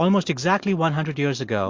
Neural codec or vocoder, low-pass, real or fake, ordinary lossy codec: none; 7.2 kHz; real; MP3, 48 kbps